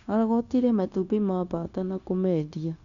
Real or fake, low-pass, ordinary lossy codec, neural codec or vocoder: fake; 7.2 kHz; none; codec, 16 kHz, 0.9 kbps, LongCat-Audio-Codec